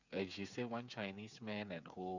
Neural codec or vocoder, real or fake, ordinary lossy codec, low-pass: codec, 16 kHz, 8 kbps, FreqCodec, smaller model; fake; none; 7.2 kHz